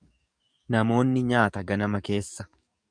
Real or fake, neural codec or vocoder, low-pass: fake; codec, 44.1 kHz, 7.8 kbps, DAC; 9.9 kHz